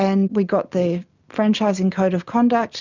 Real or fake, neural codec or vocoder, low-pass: real; none; 7.2 kHz